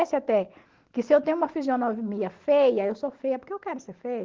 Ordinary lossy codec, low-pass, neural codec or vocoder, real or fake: Opus, 16 kbps; 7.2 kHz; none; real